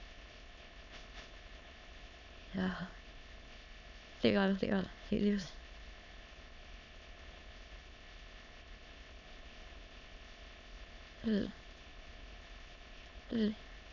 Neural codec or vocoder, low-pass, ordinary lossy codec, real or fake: autoencoder, 22.05 kHz, a latent of 192 numbers a frame, VITS, trained on many speakers; 7.2 kHz; none; fake